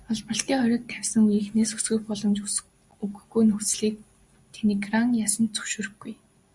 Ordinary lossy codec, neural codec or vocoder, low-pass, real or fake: Opus, 64 kbps; none; 10.8 kHz; real